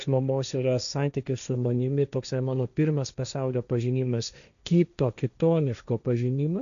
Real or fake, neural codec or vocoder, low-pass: fake; codec, 16 kHz, 1.1 kbps, Voila-Tokenizer; 7.2 kHz